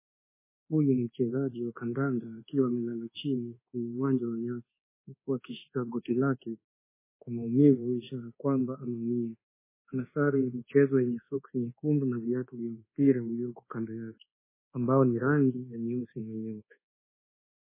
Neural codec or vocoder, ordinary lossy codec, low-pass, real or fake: codec, 24 kHz, 1.2 kbps, DualCodec; MP3, 16 kbps; 3.6 kHz; fake